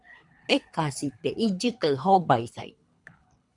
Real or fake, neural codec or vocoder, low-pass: fake; codec, 24 kHz, 3 kbps, HILCodec; 10.8 kHz